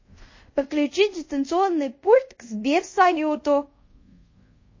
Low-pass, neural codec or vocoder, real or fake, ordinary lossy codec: 7.2 kHz; codec, 24 kHz, 0.5 kbps, DualCodec; fake; MP3, 32 kbps